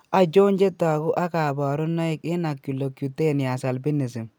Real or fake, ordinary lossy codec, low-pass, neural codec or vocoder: real; none; none; none